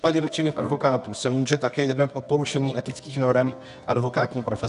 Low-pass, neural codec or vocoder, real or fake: 10.8 kHz; codec, 24 kHz, 0.9 kbps, WavTokenizer, medium music audio release; fake